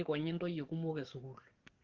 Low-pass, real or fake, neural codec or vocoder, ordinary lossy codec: 7.2 kHz; fake; codec, 44.1 kHz, 7.8 kbps, DAC; Opus, 16 kbps